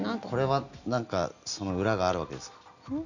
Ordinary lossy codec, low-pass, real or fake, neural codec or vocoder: none; 7.2 kHz; real; none